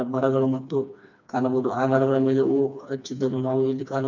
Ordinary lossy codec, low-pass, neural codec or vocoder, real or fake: none; 7.2 kHz; codec, 16 kHz, 2 kbps, FreqCodec, smaller model; fake